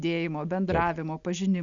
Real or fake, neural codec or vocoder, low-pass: real; none; 7.2 kHz